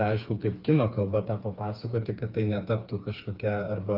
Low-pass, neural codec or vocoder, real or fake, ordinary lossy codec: 5.4 kHz; codec, 16 kHz, 4 kbps, FreqCodec, smaller model; fake; Opus, 32 kbps